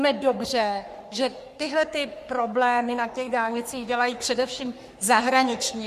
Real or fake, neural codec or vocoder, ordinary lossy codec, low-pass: fake; codec, 44.1 kHz, 3.4 kbps, Pupu-Codec; Opus, 64 kbps; 14.4 kHz